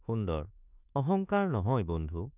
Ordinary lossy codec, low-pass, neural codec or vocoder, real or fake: none; 3.6 kHz; autoencoder, 48 kHz, 32 numbers a frame, DAC-VAE, trained on Japanese speech; fake